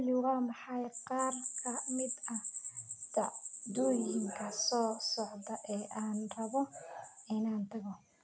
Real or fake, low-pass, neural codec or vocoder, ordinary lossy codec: real; none; none; none